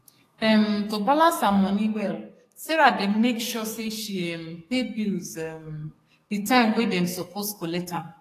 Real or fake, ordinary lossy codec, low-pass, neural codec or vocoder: fake; AAC, 48 kbps; 14.4 kHz; codec, 44.1 kHz, 2.6 kbps, SNAC